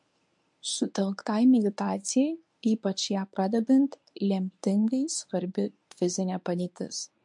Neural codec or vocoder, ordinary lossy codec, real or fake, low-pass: codec, 24 kHz, 0.9 kbps, WavTokenizer, medium speech release version 1; MP3, 64 kbps; fake; 10.8 kHz